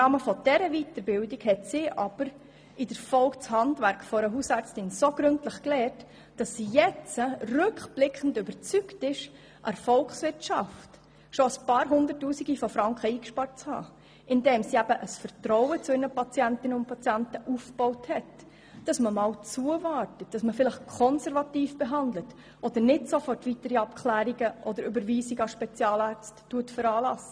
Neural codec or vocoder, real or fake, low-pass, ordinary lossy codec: none; real; none; none